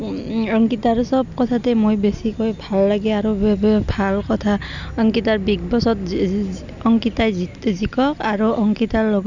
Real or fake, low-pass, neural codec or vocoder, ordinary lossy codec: real; 7.2 kHz; none; none